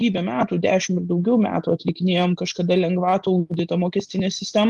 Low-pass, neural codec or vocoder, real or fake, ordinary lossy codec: 10.8 kHz; none; real; Opus, 24 kbps